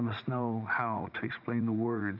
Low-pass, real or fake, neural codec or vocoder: 5.4 kHz; fake; vocoder, 44.1 kHz, 80 mel bands, Vocos